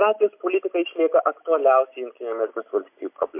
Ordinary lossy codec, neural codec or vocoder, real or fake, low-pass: MP3, 32 kbps; none; real; 3.6 kHz